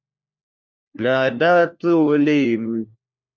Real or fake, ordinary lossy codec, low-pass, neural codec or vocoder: fake; MP3, 64 kbps; 7.2 kHz; codec, 16 kHz, 1 kbps, FunCodec, trained on LibriTTS, 50 frames a second